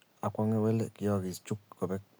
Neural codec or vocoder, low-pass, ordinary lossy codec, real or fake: none; none; none; real